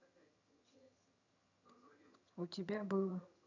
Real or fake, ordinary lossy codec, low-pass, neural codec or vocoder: fake; none; 7.2 kHz; vocoder, 22.05 kHz, 80 mel bands, HiFi-GAN